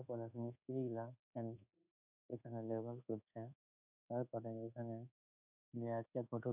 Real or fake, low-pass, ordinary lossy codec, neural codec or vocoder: fake; 3.6 kHz; none; codec, 24 kHz, 1.2 kbps, DualCodec